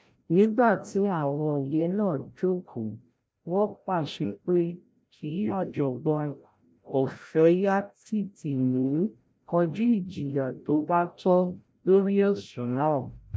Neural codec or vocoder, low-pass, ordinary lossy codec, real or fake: codec, 16 kHz, 0.5 kbps, FreqCodec, larger model; none; none; fake